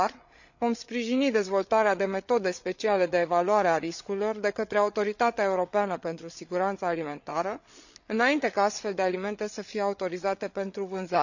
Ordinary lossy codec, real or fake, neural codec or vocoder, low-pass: none; fake; codec, 16 kHz, 8 kbps, FreqCodec, larger model; 7.2 kHz